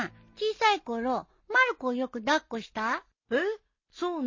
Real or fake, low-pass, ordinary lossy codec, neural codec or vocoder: real; 7.2 kHz; MP3, 32 kbps; none